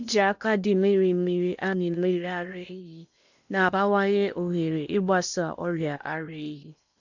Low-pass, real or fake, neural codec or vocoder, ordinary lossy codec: 7.2 kHz; fake; codec, 16 kHz in and 24 kHz out, 0.8 kbps, FocalCodec, streaming, 65536 codes; none